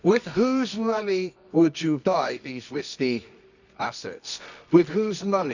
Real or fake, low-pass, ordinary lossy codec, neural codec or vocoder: fake; 7.2 kHz; none; codec, 24 kHz, 0.9 kbps, WavTokenizer, medium music audio release